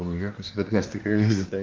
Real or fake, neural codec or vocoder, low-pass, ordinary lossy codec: fake; codec, 24 kHz, 1 kbps, SNAC; 7.2 kHz; Opus, 24 kbps